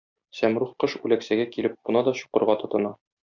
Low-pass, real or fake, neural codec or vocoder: 7.2 kHz; real; none